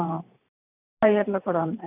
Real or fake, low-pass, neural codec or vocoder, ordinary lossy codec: fake; 3.6 kHz; vocoder, 44.1 kHz, 128 mel bands every 512 samples, BigVGAN v2; none